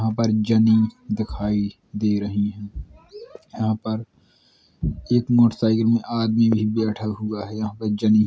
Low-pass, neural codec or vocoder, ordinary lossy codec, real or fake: none; none; none; real